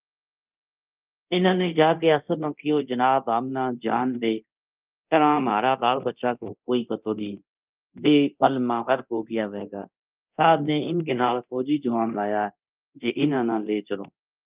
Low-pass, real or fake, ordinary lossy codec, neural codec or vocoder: 3.6 kHz; fake; Opus, 32 kbps; codec, 24 kHz, 0.9 kbps, DualCodec